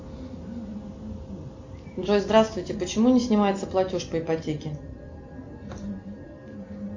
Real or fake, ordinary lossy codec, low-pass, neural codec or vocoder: real; AAC, 48 kbps; 7.2 kHz; none